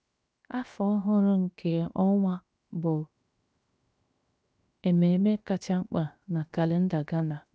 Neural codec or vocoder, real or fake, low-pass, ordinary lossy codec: codec, 16 kHz, 0.7 kbps, FocalCodec; fake; none; none